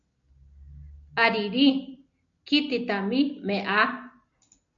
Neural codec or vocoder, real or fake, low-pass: none; real; 7.2 kHz